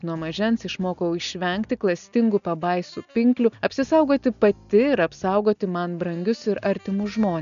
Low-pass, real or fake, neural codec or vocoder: 7.2 kHz; real; none